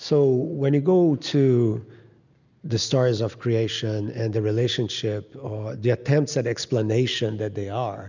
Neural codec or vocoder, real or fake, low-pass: none; real; 7.2 kHz